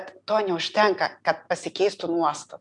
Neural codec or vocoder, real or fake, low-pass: none; real; 10.8 kHz